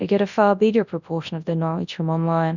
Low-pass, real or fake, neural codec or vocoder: 7.2 kHz; fake; codec, 24 kHz, 0.9 kbps, WavTokenizer, large speech release